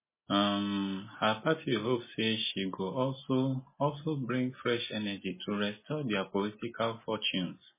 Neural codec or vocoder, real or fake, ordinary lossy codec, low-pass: none; real; MP3, 16 kbps; 3.6 kHz